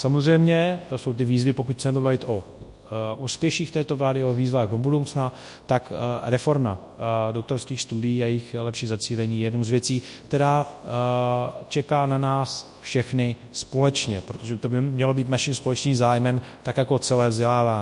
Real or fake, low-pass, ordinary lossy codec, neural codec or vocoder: fake; 10.8 kHz; MP3, 48 kbps; codec, 24 kHz, 0.9 kbps, WavTokenizer, large speech release